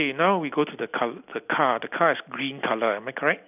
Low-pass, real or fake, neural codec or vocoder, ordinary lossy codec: 3.6 kHz; real; none; none